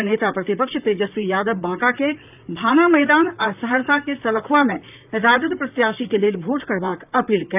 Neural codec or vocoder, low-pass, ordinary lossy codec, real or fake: vocoder, 44.1 kHz, 128 mel bands, Pupu-Vocoder; 3.6 kHz; none; fake